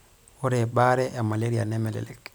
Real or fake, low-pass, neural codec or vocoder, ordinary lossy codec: real; none; none; none